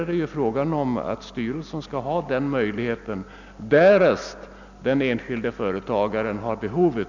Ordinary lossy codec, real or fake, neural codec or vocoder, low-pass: none; real; none; 7.2 kHz